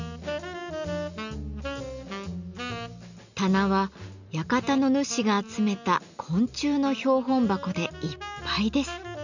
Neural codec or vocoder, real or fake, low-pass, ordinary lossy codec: none; real; 7.2 kHz; none